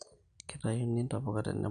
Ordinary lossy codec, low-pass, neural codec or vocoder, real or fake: none; 9.9 kHz; none; real